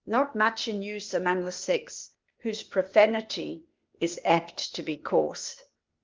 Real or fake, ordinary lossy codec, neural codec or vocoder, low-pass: fake; Opus, 32 kbps; codec, 16 kHz, 0.8 kbps, ZipCodec; 7.2 kHz